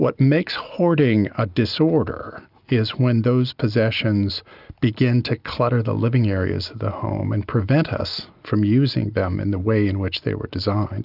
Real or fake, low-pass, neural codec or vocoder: real; 5.4 kHz; none